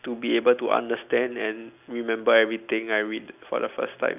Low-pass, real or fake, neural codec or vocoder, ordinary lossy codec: 3.6 kHz; real; none; none